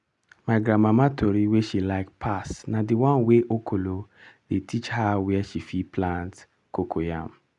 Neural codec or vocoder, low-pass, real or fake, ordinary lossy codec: none; 10.8 kHz; real; none